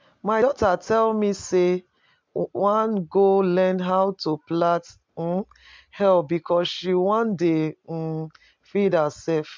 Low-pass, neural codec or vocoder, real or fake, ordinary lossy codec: 7.2 kHz; none; real; MP3, 64 kbps